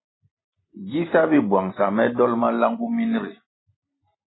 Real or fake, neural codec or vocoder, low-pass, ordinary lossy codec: fake; vocoder, 44.1 kHz, 128 mel bands every 256 samples, BigVGAN v2; 7.2 kHz; AAC, 16 kbps